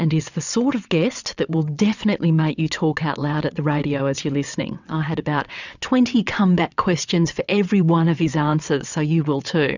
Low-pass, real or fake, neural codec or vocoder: 7.2 kHz; fake; vocoder, 22.05 kHz, 80 mel bands, WaveNeXt